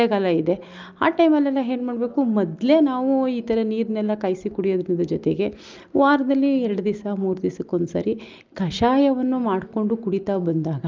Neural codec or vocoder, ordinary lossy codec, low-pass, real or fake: none; Opus, 24 kbps; 7.2 kHz; real